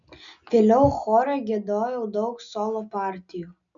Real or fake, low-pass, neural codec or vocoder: real; 7.2 kHz; none